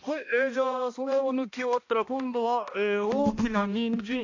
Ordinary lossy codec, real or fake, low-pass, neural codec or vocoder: AAC, 48 kbps; fake; 7.2 kHz; codec, 16 kHz, 1 kbps, X-Codec, HuBERT features, trained on balanced general audio